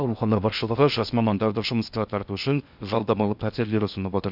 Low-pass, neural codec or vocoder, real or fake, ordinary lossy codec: 5.4 kHz; codec, 16 kHz in and 24 kHz out, 0.6 kbps, FocalCodec, streaming, 2048 codes; fake; none